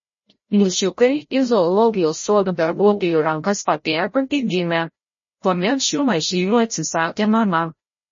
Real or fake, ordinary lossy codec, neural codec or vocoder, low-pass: fake; MP3, 32 kbps; codec, 16 kHz, 0.5 kbps, FreqCodec, larger model; 7.2 kHz